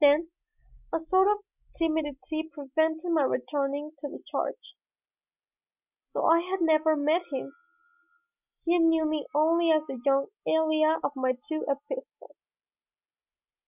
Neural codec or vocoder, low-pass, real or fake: none; 3.6 kHz; real